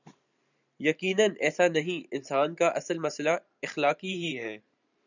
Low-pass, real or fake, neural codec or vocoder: 7.2 kHz; fake; vocoder, 44.1 kHz, 128 mel bands every 512 samples, BigVGAN v2